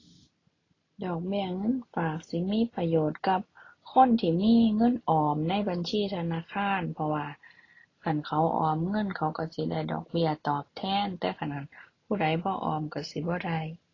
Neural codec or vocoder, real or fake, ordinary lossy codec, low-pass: none; real; AAC, 32 kbps; 7.2 kHz